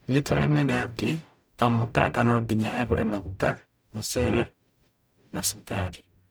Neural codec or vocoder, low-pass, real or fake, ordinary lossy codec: codec, 44.1 kHz, 0.9 kbps, DAC; none; fake; none